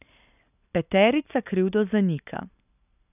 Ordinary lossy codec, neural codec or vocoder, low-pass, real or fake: none; none; 3.6 kHz; real